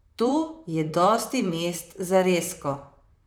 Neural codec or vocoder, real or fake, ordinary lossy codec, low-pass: vocoder, 44.1 kHz, 128 mel bands, Pupu-Vocoder; fake; none; none